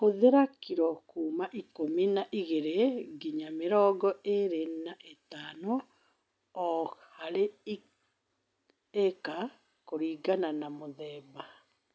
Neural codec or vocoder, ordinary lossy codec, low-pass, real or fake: none; none; none; real